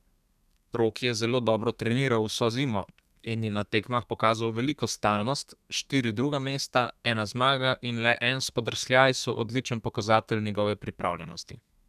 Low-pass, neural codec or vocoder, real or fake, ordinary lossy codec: 14.4 kHz; codec, 32 kHz, 1.9 kbps, SNAC; fake; none